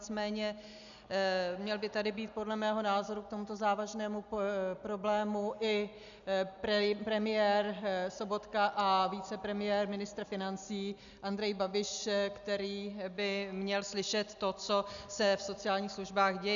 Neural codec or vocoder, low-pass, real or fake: none; 7.2 kHz; real